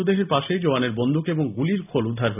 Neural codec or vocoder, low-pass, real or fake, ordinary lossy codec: none; 3.6 kHz; real; none